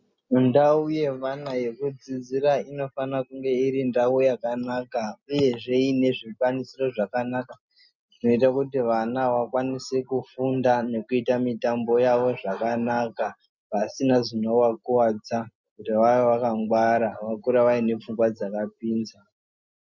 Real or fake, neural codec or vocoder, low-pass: real; none; 7.2 kHz